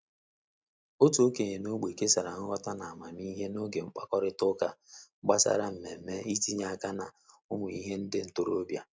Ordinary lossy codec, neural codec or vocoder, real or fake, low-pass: none; none; real; none